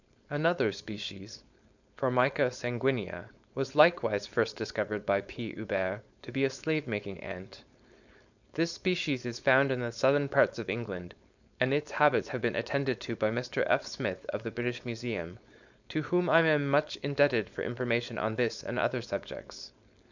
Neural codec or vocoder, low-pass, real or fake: codec, 16 kHz, 4.8 kbps, FACodec; 7.2 kHz; fake